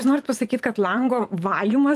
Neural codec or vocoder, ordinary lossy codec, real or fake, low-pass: vocoder, 44.1 kHz, 128 mel bands every 512 samples, BigVGAN v2; Opus, 32 kbps; fake; 14.4 kHz